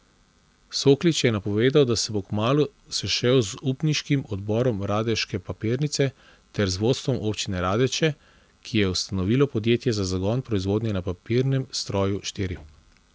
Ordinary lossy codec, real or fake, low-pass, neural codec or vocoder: none; real; none; none